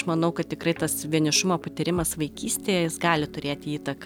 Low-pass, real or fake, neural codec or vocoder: 19.8 kHz; real; none